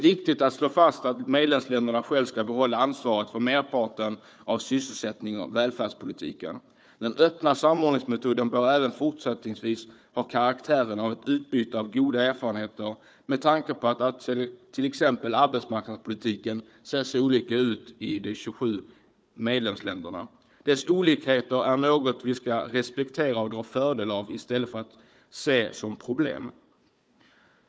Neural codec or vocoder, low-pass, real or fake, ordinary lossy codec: codec, 16 kHz, 4 kbps, FunCodec, trained on Chinese and English, 50 frames a second; none; fake; none